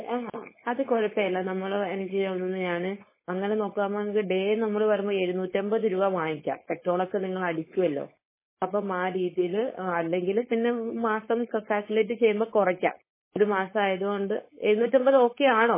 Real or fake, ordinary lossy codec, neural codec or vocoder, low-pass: fake; MP3, 16 kbps; codec, 16 kHz, 4.8 kbps, FACodec; 3.6 kHz